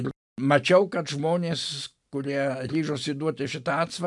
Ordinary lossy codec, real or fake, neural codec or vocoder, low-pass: MP3, 96 kbps; real; none; 10.8 kHz